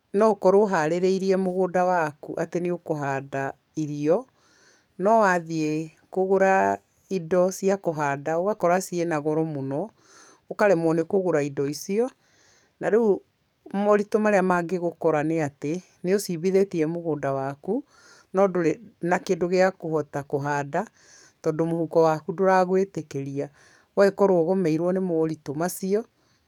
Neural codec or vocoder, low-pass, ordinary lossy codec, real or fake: codec, 44.1 kHz, 7.8 kbps, DAC; 19.8 kHz; none; fake